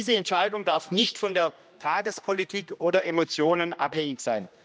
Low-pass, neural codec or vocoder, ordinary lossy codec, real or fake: none; codec, 16 kHz, 1 kbps, X-Codec, HuBERT features, trained on general audio; none; fake